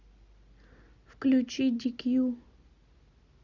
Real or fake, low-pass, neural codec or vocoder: real; 7.2 kHz; none